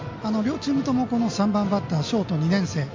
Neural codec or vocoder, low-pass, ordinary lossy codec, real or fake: none; 7.2 kHz; MP3, 48 kbps; real